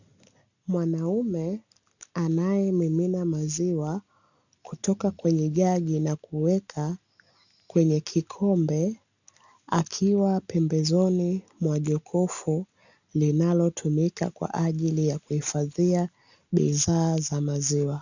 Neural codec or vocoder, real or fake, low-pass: none; real; 7.2 kHz